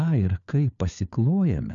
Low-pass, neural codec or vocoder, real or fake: 7.2 kHz; codec, 16 kHz, 4 kbps, FunCodec, trained on LibriTTS, 50 frames a second; fake